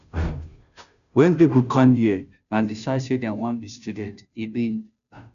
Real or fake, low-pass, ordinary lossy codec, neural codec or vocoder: fake; 7.2 kHz; none; codec, 16 kHz, 0.5 kbps, FunCodec, trained on Chinese and English, 25 frames a second